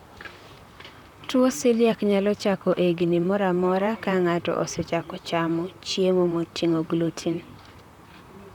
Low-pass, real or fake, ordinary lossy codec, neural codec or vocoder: 19.8 kHz; fake; none; vocoder, 44.1 kHz, 128 mel bands, Pupu-Vocoder